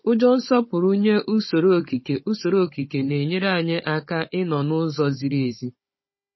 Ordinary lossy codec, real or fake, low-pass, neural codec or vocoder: MP3, 24 kbps; fake; 7.2 kHz; codec, 16 kHz, 16 kbps, FunCodec, trained on Chinese and English, 50 frames a second